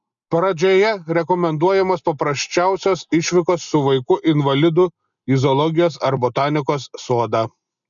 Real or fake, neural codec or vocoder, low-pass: real; none; 7.2 kHz